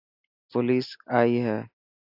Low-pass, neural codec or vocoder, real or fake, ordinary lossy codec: 5.4 kHz; none; real; AAC, 48 kbps